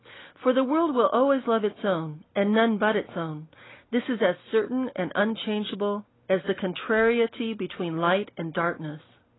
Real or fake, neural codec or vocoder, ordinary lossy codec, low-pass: real; none; AAC, 16 kbps; 7.2 kHz